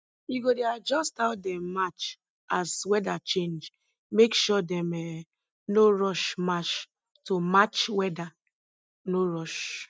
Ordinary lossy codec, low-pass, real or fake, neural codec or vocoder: none; none; real; none